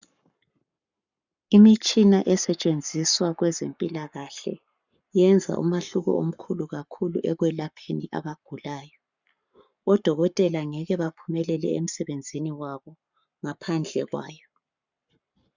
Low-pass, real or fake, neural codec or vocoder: 7.2 kHz; fake; codec, 44.1 kHz, 7.8 kbps, Pupu-Codec